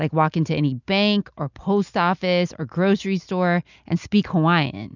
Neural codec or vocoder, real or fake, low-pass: none; real; 7.2 kHz